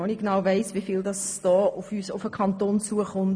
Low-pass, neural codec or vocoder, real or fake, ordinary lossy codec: none; none; real; none